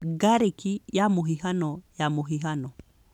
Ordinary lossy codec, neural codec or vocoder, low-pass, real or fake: none; none; 19.8 kHz; real